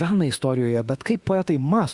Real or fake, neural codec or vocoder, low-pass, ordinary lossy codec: fake; autoencoder, 48 kHz, 32 numbers a frame, DAC-VAE, trained on Japanese speech; 10.8 kHz; Opus, 64 kbps